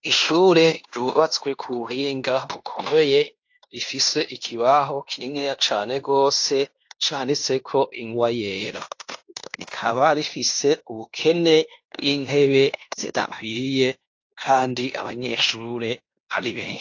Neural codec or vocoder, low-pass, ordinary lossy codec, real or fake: codec, 16 kHz in and 24 kHz out, 0.9 kbps, LongCat-Audio-Codec, fine tuned four codebook decoder; 7.2 kHz; AAC, 48 kbps; fake